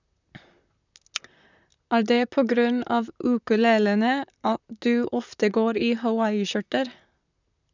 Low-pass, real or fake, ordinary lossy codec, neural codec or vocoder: 7.2 kHz; fake; none; vocoder, 44.1 kHz, 128 mel bands, Pupu-Vocoder